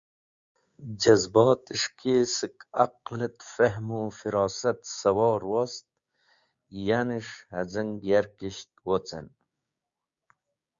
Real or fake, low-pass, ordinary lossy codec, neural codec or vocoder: fake; 7.2 kHz; Opus, 64 kbps; codec, 16 kHz, 6 kbps, DAC